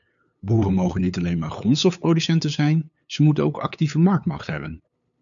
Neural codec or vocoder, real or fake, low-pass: codec, 16 kHz, 8 kbps, FunCodec, trained on LibriTTS, 25 frames a second; fake; 7.2 kHz